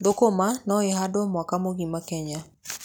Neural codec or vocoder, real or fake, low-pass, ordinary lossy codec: none; real; none; none